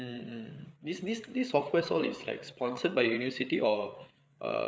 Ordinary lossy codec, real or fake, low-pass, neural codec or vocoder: none; fake; none; codec, 16 kHz, 8 kbps, FreqCodec, larger model